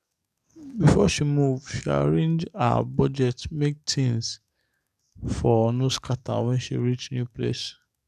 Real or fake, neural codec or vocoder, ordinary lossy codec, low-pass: fake; codec, 44.1 kHz, 7.8 kbps, DAC; none; 14.4 kHz